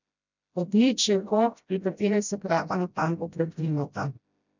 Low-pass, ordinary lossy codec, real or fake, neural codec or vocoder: 7.2 kHz; none; fake; codec, 16 kHz, 0.5 kbps, FreqCodec, smaller model